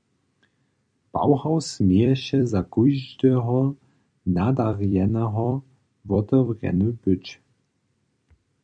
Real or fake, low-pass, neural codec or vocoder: fake; 9.9 kHz; vocoder, 44.1 kHz, 128 mel bands every 256 samples, BigVGAN v2